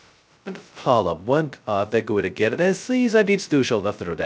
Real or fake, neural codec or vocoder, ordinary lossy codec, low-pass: fake; codec, 16 kHz, 0.2 kbps, FocalCodec; none; none